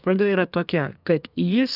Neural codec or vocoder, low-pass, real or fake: codec, 32 kHz, 1.9 kbps, SNAC; 5.4 kHz; fake